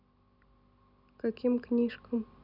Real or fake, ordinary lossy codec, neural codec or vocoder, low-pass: real; none; none; 5.4 kHz